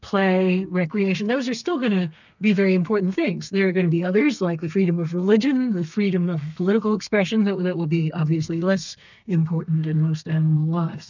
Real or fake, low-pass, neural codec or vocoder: fake; 7.2 kHz; codec, 32 kHz, 1.9 kbps, SNAC